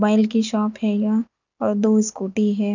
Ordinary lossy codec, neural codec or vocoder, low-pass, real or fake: none; none; 7.2 kHz; real